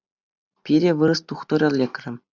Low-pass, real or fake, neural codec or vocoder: 7.2 kHz; real; none